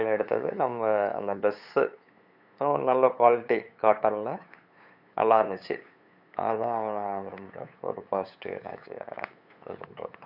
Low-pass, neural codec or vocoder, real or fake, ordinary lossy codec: 5.4 kHz; codec, 16 kHz, 8 kbps, FunCodec, trained on LibriTTS, 25 frames a second; fake; none